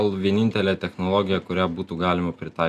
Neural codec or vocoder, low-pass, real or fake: none; 14.4 kHz; real